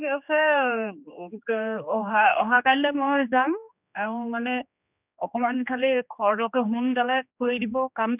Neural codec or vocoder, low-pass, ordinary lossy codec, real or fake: codec, 16 kHz, 2 kbps, X-Codec, HuBERT features, trained on general audio; 3.6 kHz; none; fake